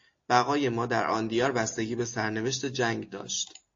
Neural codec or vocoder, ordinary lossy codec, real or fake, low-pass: none; AAC, 48 kbps; real; 7.2 kHz